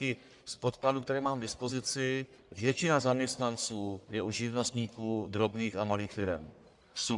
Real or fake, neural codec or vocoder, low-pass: fake; codec, 44.1 kHz, 1.7 kbps, Pupu-Codec; 10.8 kHz